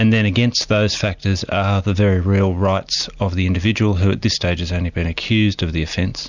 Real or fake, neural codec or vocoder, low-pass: real; none; 7.2 kHz